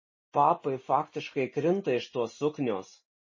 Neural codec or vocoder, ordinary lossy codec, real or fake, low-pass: none; MP3, 32 kbps; real; 7.2 kHz